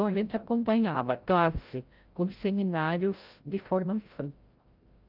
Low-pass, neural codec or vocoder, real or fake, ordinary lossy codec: 5.4 kHz; codec, 16 kHz, 0.5 kbps, FreqCodec, larger model; fake; Opus, 24 kbps